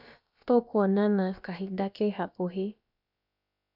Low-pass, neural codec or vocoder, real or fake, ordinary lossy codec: 5.4 kHz; codec, 16 kHz, about 1 kbps, DyCAST, with the encoder's durations; fake; none